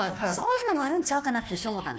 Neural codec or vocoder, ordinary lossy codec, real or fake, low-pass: codec, 16 kHz, 1 kbps, FunCodec, trained on Chinese and English, 50 frames a second; none; fake; none